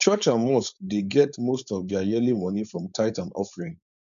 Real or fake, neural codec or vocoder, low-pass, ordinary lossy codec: fake; codec, 16 kHz, 4.8 kbps, FACodec; 7.2 kHz; none